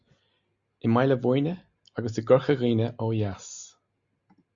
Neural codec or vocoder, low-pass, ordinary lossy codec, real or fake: none; 7.2 kHz; Opus, 64 kbps; real